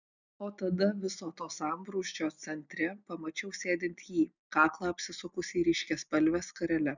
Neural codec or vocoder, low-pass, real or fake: none; 7.2 kHz; real